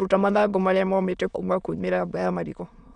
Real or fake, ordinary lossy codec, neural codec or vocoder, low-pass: fake; none; autoencoder, 22.05 kHz, a latent of 192 numbers a frame, VITS, trained on many speakers; 9.9 kHz